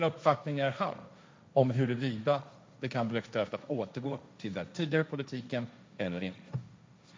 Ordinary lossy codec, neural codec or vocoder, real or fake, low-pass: none; codec, 16 kHz, 1.1 kbps, Voila-Tokenizer; fake; none